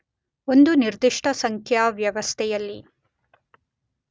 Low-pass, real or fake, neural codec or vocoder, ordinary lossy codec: none; real; none; none